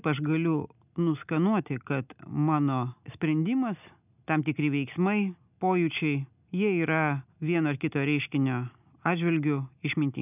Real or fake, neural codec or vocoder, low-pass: real; none; 3.6 kHz